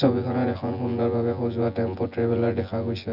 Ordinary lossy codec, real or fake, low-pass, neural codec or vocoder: Opus, 64 kbps; fake; 5.4 kHz; vocoder, 24 kHz, 100 mel bands, Vocos